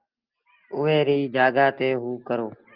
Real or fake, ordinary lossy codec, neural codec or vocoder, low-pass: real; Opus, 32 kbps; none; 5.4 kHz